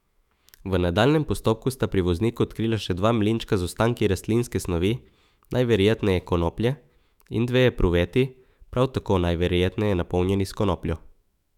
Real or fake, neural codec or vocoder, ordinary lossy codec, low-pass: fake; autoencoder, 48 kHz, 128 numbers a frame, DAC-VAE, trained on Japanese speech; none; 19.8 kHz